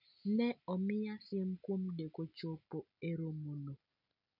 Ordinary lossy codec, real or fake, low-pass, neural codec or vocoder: none; real; 5.4 kHz; none